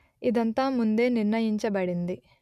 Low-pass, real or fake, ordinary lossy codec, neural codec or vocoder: 14.4 kHz; real; none; none